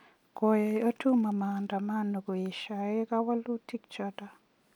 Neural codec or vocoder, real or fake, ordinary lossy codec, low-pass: none; real; none; 19.8 kHz